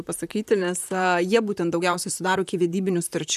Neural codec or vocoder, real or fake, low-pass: vocoder, 44.1 kHz, 128 mel bands, Pupu-Vocoder; fake; 14.4 kHz